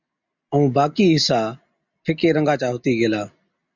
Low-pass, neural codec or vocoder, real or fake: 7.2 kHz; none; real